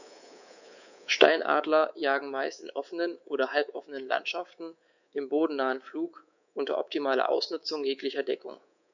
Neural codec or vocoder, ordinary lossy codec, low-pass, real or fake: codec, 24 kHz, 3.1 kbps, DualCodec; none; 7.2 kHz; fake